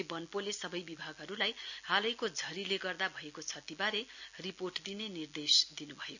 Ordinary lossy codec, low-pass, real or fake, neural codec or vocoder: none; 7.2 kHz; real; none